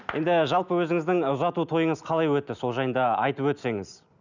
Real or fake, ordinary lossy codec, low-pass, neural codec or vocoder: real; none; 7.2 kHz; none